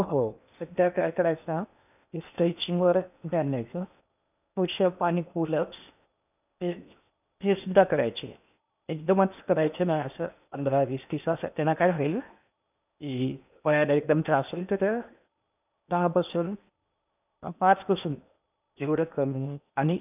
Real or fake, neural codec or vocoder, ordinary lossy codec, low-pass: fake; codec, 16 kHz in and 24 kHz out, 0.8 kbps, FocalCodec, streaming, 65536 codes; none; 3.6 kHz